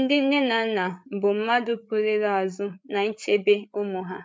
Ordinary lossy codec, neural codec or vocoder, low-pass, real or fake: none; codec, 16 kHz, 8 kbps, FreqCodec, larger model; none; fake